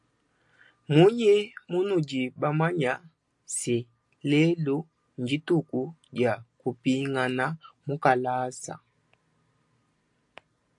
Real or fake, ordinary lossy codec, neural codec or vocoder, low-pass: real; AAC, 64 kbps; none; 10.8 kHz